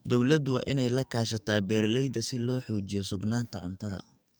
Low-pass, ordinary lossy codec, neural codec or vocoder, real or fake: none; none; codec, 44.1 kHz, 2.6 kbps, SNAC; fake